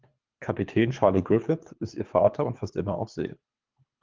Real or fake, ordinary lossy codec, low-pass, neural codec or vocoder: fake; Opus, 24 kbps; 7.2 kHz; codec, 24 kHz, 3 kbps, HILCodec